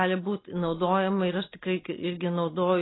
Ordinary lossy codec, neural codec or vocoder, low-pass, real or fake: AAC, 16 kbps; none; 7.2 kHz; real